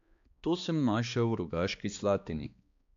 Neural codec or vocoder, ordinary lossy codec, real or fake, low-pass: codec, 16 kHz, 2 kbps, X-Codec, HuBERT features, trained on balanced general audio; AAC, 64 kbps; fake; 7.2 kHz